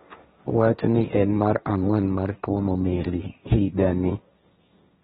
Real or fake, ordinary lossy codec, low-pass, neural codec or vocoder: fake; AAC, 16 kbps; 7.2 kHz; codec, 16 kHz, 1.1 kbps, Voila-Tokenizer